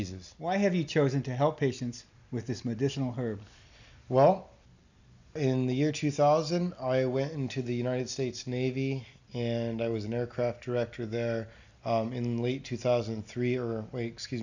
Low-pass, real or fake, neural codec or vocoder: 7.2 kHz; real; none